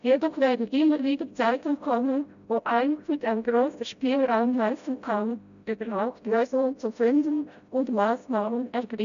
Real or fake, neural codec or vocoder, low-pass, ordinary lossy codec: fake; codec, 16 kHz, 0.5 kbps, FreqCodec, smaller model; 7.2 kHz; MP3, 96 kbps